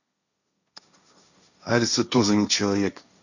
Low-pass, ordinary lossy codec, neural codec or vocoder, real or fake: 7.2 kHz; none; codec, 16 kHz, 1.1 kbps, Voila-Tokenizer; fake